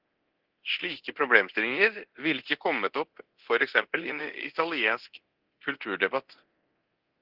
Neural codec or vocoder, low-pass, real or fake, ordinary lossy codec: codec, 24 kHz, 0.9 kbps, DualCodec; 5.4 kHz; fake; Opus, 16 kbps